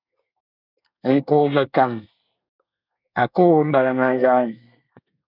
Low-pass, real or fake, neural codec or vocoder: 5.4 kHz; fake; codec, 24 kHz, 1 kbps, SNAC